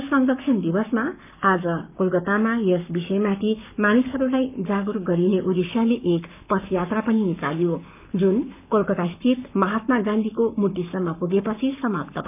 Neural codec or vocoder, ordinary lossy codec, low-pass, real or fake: codec, 44.1 kHz, 7.8 kbps, Pupu-Codec; none; 3.6 kHz; fake